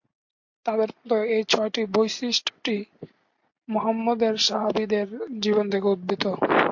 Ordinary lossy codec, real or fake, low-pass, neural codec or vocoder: MP3, 48 kbps; real; 7.2 kHz; none